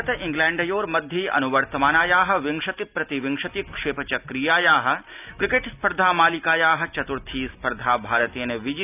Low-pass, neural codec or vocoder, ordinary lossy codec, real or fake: 3.6 kHz; none; none; real